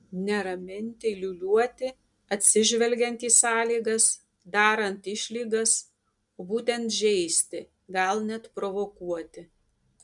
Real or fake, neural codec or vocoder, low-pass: real; none; 10.8 kHz